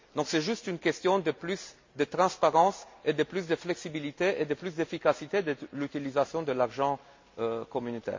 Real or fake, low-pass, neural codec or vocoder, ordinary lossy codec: real; 7.2 kHz; none; MP3, 48 kbps